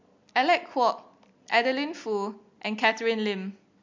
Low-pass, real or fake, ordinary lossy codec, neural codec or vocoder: 7.2 kHz; real; MP3, 64 kbps; none